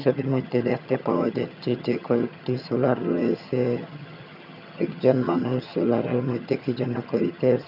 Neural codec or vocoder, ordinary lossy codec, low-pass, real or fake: vocoder, 22.05 kHz, 80 mel bands, HiFi-GAN; none; 5.4 kHz; fake